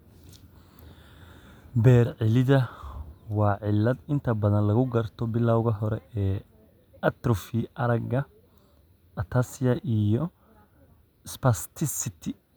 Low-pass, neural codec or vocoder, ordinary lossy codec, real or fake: none; none; none; real